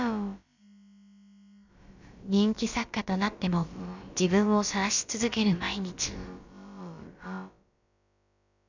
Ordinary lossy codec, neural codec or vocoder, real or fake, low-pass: none; codec, 16 kHz, about 1 kbps, DyCAST, with the encoder's durations; fake; 7.2 kHz